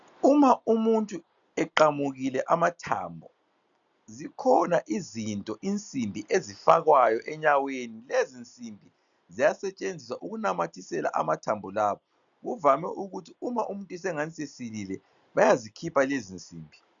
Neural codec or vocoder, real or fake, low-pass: none; real; 7.2 kHz